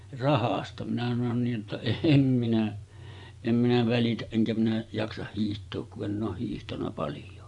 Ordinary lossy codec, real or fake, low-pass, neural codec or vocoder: none; fake; 10.8 kHz; vocoder, 24 kHz, 100 mel bands, Vocos